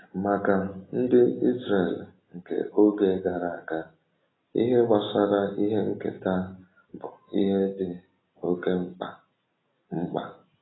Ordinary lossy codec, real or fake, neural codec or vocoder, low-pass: AAC, 16 kbps; real; none; 7.2 kHz